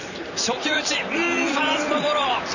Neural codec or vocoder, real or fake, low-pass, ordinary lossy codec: vocoder, 44.1 kHz, 128 mel bands, Pupu-Vocoder; fake; 7.2 kHz; none